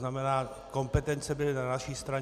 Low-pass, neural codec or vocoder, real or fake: 14.4 kHz; none; real